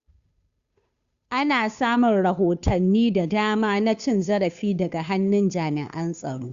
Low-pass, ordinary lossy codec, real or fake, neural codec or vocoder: 7.2 kHz; Opus, 64 kbps; fake; codec, 16 kHz, 2 kbps, FunCodec, trained on Chinese and English, 25 frames a second